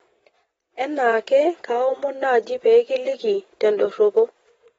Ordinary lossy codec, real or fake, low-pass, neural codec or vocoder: AAC, 24 kbps; fake; 9.9 kHz; vocoder, 22.05 kHz, 80 mel bands, WaveNeXt